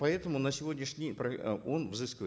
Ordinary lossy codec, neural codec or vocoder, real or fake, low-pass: none; none; real; none